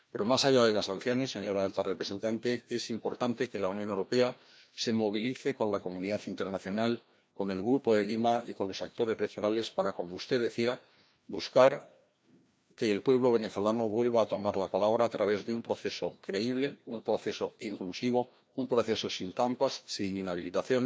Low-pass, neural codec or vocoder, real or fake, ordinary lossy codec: none; codec, 16 kHz, 1 kbps, FreqCodec, larger model; fake; none